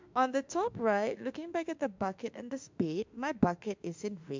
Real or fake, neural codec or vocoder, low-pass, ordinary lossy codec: fake; autoencoder, 48 kHz, 32 numbers a frame, DAC-VAE, trained on Japanese speech; 7.2 kHz; MP3, 64 kbps